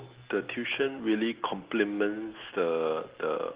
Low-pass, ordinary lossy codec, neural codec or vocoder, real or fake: 3.6 kHz; Opus, 16 kbps; none; real